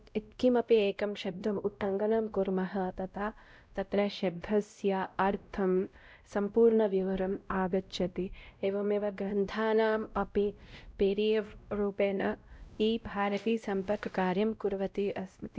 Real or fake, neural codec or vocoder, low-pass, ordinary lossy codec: fake; codec, 16 kHz, 0.5 kbps, X-Codec, WavLM features, trained on Multilingual LibriSpeech; none; none